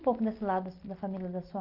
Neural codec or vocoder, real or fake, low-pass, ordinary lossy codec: none; real; 5.4 kHz; Opus, 24 kbps